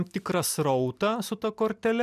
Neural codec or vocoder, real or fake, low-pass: none; real; 14.4 kHz